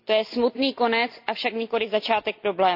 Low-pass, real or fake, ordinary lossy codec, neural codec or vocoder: 5.4 kHz; real; none; none